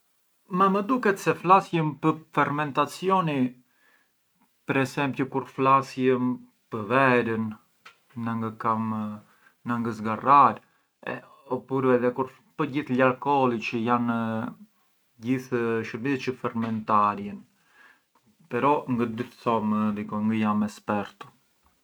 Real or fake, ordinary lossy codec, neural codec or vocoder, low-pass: real; none; none; none